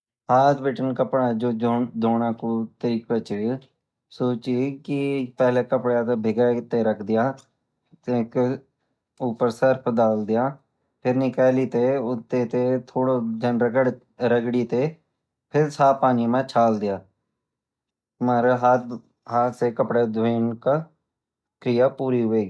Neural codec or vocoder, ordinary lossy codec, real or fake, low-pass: none; none; real; none